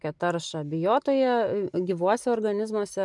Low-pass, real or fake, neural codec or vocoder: 10.8 kHz; real; none